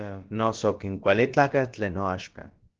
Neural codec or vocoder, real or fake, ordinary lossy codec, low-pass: codec, 16 kHz, about 1 kbps, DyCAST, with the encoder's durations; fake; Opus, 16 kbps; 7.2 kHz